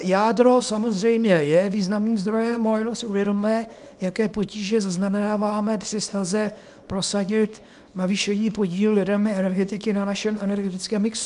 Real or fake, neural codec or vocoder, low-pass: fake; codec, 24 kHz, 0.9 kbps, WavTokenizer, small release; 10.8 kHz